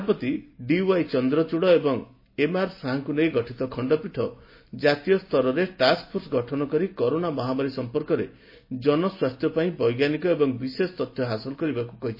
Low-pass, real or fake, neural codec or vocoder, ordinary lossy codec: 5.4 kHz; real; none; MP3, 24 kbps